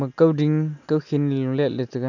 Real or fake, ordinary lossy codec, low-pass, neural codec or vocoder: real; none; 7.2 kHz; none